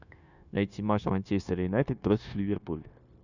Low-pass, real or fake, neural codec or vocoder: 7.2 kHz; fake; codec, 16 kHz, 0.9 kbps, LongCat-Audio-Codec